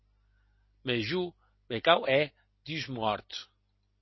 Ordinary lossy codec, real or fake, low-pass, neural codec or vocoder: MP3, 24 kbps; real; 7.2 kHz; none